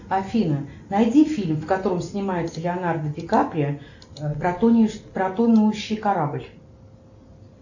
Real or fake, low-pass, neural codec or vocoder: real; 7.2 kHz; none